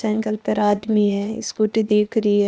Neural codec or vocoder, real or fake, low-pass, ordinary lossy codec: codec, 16 kHz, 0.8 kbps, ZipCodec; fake; none; none